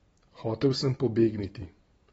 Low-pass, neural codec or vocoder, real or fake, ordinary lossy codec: 19.8 kHz; none; real; AAC, 24 kbps